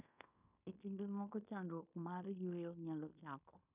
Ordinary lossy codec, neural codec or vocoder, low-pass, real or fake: none; codec, 16 kHz in and 24 kHz out, 0.9 kbps, LongCat-Audio-Codec, fine tuned four codebook decoder; 3.6 kHz; fake